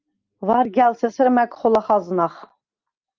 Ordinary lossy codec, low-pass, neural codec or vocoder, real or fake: Opus, 32 kbps; 7.2 kHz; none; real